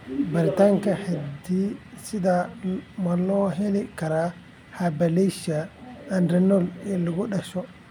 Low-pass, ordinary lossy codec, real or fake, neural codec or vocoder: 19.8 kHz; none; real; none